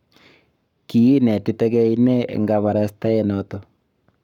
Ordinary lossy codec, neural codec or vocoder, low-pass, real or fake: none; codec, 44.1 kHz, 7.8 kbps, Pupu-Codec; 19.8 kHz; fake